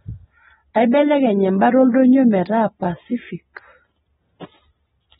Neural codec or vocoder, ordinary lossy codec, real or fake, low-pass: none; AAC, 16 kbps; real; 19.8 kHz